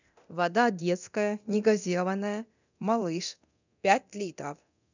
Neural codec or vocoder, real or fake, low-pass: codec, 24 kHz, 0.9 kbps, DualCodec; fake; 7.2 kHz